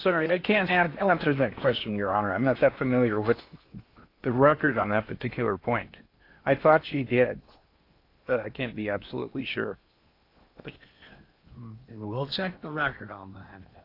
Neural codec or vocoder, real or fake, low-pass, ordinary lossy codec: codec, 16 kHz in and 24 kHz out, 0.8 kbps, FocalCodec, streaming, 65536 codes; fake; 5.4 kHz; AAC, 32 kbps